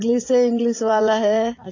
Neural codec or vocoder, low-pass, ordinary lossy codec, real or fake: vocoder, 44.1 kHz, 80 mel bands, Vocos; 7.2 kHz; AAC, 32 kbps; fake